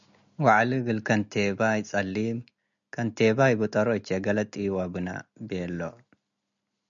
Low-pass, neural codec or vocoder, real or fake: 7.2 kHz; none; real